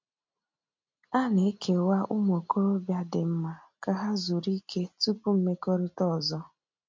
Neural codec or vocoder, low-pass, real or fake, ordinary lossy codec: none; 7.2 kHz; real; MP3, 48 kbps